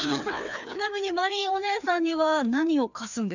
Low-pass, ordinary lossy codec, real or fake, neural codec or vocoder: 7.2 kHz; none; fake; codec, 16 kHz, 2 kbps, FreqCodec, larger model